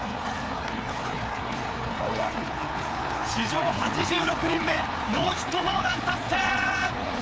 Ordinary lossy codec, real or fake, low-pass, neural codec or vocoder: none; fake; none; codec, 16 kHz, 4 kbps, FreqCodec, larger model